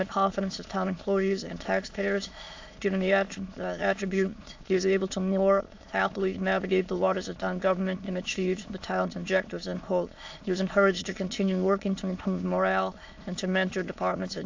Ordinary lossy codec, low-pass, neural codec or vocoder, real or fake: AAC, 48 kbps; 7.2 kHz; autoencoder, 22.05 kHz, a latent of 192 numbers a frame, VITS, trained on many speakers; fake